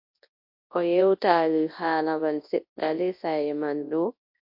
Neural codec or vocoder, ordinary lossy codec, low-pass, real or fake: codec, 24 kHz, 0.9 kbps, WavTokenizer, large speech release; MP3, 32 kbps; 5.4 kHz; fake